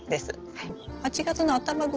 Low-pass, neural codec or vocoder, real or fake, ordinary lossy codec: 7.2 kHz; none; real; Opus, 16 kbps